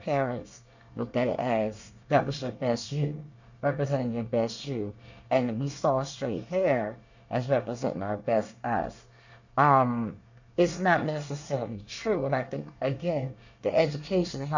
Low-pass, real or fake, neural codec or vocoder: 7.2 kHz; fake; codec, 24 kHz, 1 kbps, SNAC